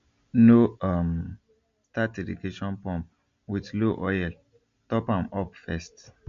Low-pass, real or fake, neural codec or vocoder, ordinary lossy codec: 7.2 kHz; real; none; MP3, 48 kbps